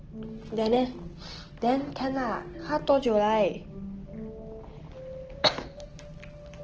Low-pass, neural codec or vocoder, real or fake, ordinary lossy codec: 7.2 kHz; none; real; Opus, 16 kbps